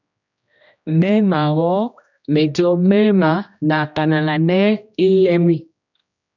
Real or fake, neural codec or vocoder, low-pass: fake; codec, 16 kHz, 1 kbps, X-Codec, HuBERT features, trained on general audio; 7.2 kHz